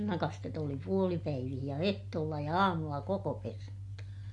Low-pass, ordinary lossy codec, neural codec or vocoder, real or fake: 10.8 kHz; MP3, 48 kbps; none; real